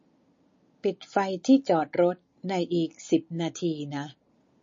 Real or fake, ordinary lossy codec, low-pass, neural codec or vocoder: real; MP3, 32 kbps; 7.2 kHz; none